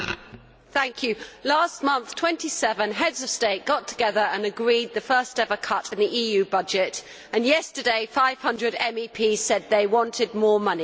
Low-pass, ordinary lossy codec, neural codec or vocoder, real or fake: none; none; none; real